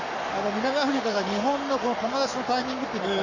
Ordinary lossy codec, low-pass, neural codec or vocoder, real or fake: none; 7.2 kHz; codec, 44.1 kHz, 7.8 kbps, Pupu-Codec; fake